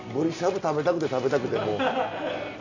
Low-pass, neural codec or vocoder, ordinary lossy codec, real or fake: 7.2 kHz; none; none; real